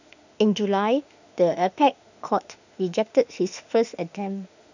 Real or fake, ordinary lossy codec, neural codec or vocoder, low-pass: fake; none; autoencoder, 48 kHz, 32 numbers a frame, DAC-VAE, trained on Japanese speech; 7.2 kHz